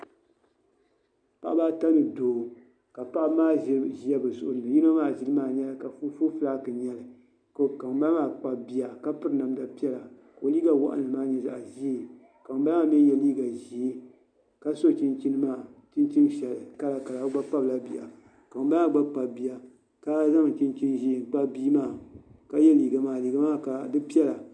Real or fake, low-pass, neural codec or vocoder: real; 9.9 kHz; none